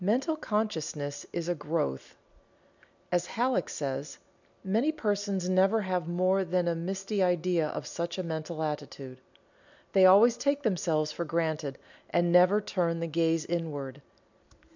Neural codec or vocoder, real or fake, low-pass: none; real; 7.2 kHz